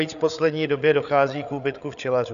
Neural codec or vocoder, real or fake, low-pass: codec, 16 kHz, 8 kbps, FreqCodec, larger model; fake; 7.2 kHz